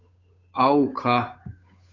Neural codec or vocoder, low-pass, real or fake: codec, 16 kHz, 8 kbps, FunCodec, trained on Chinese and English, 25 frames a second; 7.2 kHz; fake